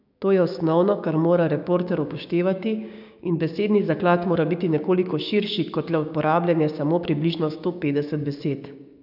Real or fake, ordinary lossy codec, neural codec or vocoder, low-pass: fake; none; codec, 16 kHz, 6 kbps, DAC; 5.4 kHz